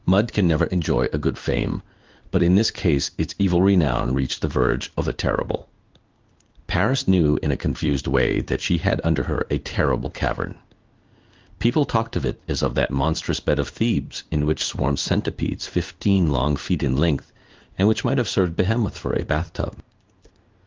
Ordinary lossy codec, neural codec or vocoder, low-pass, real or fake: Opus, 32 kbps; codec, 16 kHz in and 24 kHz out, 1 kbps, XY-Tokenizer; 7.2 kHz; fake